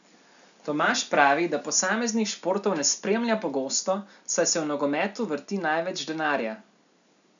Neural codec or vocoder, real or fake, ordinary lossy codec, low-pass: none; real; none; 7.2 kHz